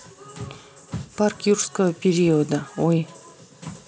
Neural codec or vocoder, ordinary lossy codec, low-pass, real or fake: none; none; none; real